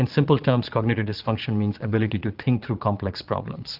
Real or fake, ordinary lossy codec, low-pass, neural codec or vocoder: fake; Opus, 32 kbps; 5.4 kHz; vocoder, 22.05 kHz, 80 mel bands, Vocos